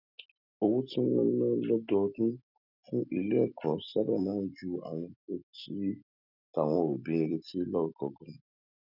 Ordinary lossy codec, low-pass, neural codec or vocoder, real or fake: none; 5.4 kHz; none; real